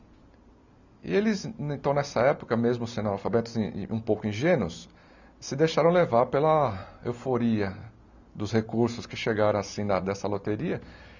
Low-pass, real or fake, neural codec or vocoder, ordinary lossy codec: 7.2 kHz; real; none; none